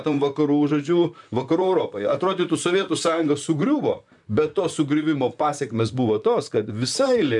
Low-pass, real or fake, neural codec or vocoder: 10.8 kHz; fake; vocoder, 44.1 kHz, 128 mel bands, Pupu-Vocoder